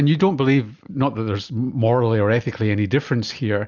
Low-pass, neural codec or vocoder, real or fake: 7.2 kHz; none; real